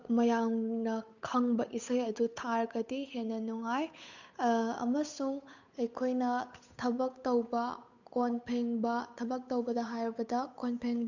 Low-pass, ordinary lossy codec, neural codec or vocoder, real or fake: 7.2 kHz; none; codec, 16 kHz, 8 kbps, FunCodec, trained on Chinese and English, 25 frames a second; fake